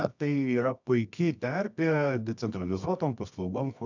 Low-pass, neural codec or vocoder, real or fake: 7.2 kHz; codec, 24 kHz, 0.9 kbps, WavTokenizer, medium music audio release; fake